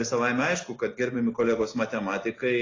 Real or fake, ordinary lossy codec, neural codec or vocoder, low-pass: real; AAC, 32 kbps; none; 7.2 kHz